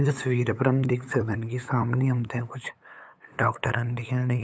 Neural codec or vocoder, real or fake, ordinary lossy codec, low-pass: codec, 16 kHz, 8 kbps, FunCodec, trained on LibriTTS, 25 frames a second; fake; none; none